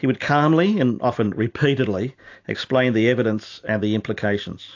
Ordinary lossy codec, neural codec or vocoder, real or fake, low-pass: AAC, 48 kbps; none; real; 7.2 kHz